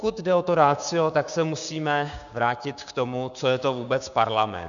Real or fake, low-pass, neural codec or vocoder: fake; 7.2 kHz; codec, 16 kHz, 6 kbps, DAC